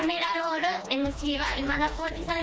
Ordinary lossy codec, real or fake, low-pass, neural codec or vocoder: none; fake; none; codec, 16 kHz, 2 kbps, FreqCodec, smaller model